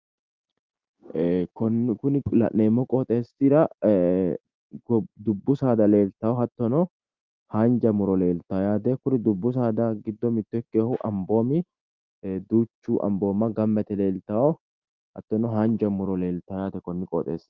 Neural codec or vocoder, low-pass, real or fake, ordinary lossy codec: none; 7.2 kHz; real; Opus, 32 kbps